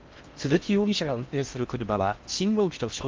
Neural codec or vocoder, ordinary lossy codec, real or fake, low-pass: codec, 16 kHz in and 24 kHz out, 0.6 kbps, FocalCodec, streaming, 4096 codes; Opus, 32 kbps; fake; 7.2 kHz